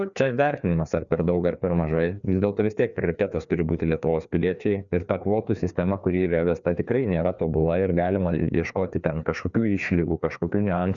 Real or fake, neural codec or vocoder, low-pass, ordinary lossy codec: fake; codec, 16 kHz, 2 kbps, FreqCodec, larger model; 7.2 kHz; MP3, 96 kbps